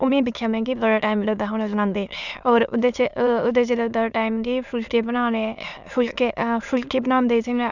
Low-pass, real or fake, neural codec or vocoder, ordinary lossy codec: 7.2 kHz; fake; autoencoder, 22.05 kHz, a latent of 192 numbers a frame, VITS, trained on many speakers; none